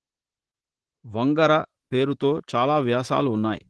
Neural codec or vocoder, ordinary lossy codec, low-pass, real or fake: vocoder, 44.1 kHz, 128 mel bands, Pupu-Vocoder; Opus, 16 kbps; 10.8 kHz; fake